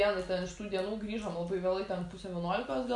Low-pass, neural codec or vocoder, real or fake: 10.8 kHz; none; real